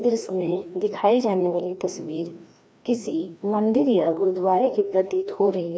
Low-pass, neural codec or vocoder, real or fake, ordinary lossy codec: none; codec, 16 kHz, 1 kbps, FreqCodec, larger model; fake; none